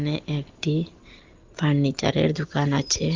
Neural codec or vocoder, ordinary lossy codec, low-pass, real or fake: none; Opus, 16 kbps; 7.2 kHz; real